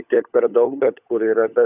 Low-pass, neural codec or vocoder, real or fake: 3.6 kHz; codec, 16 kHz, 16 kbps, FunCodec, trained on LibriTTS, 50 frames a second; fake